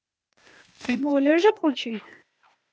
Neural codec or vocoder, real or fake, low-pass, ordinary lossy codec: codec, 16 kHz, 0.8 kbps, ZipCodec; fake; none; none